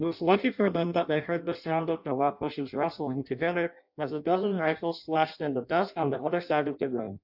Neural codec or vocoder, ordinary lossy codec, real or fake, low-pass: codec, 16 kHz in and 24 kHz out, 0.6 kbps, FireRedTTS-2 codec; AAC, 48 kbps; fake; 5.4 kHz